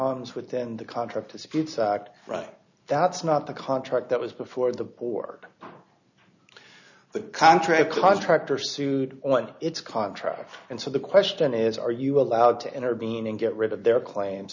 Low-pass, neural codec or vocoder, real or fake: 7.2 kHz; none; real